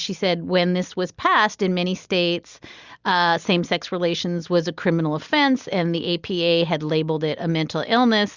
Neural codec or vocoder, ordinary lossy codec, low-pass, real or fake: none; Opus, 64 kbps; 7.2 kHz; real